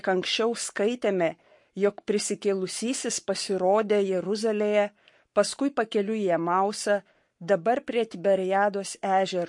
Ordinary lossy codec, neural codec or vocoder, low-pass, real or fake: MP3, 48 kbps; codec, 44.1 kHz, 7.8 kbps, Pupu-Codec; 10.8 kHz; fake